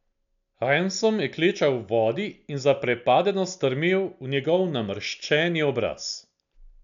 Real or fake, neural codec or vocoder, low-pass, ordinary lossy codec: real; none; 7.2 kHz; none